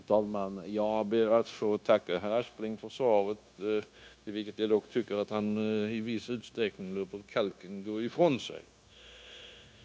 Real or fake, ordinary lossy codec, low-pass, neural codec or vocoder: fake; none; none; codec, 16 kHz, 0.9 kbps, LongCat-Audio-Codec